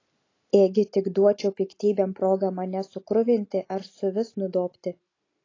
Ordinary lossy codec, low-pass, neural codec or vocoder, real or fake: AAC, 32 kbps; 7.2 kHz; none; real